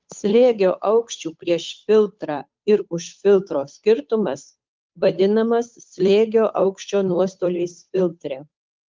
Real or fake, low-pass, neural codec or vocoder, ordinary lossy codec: fake; 7.2 kHz; codec, 16 kHz, 8 kbps, FunCodec, trained on Chinese and English, 25 frames a second; Opus, 24 kbps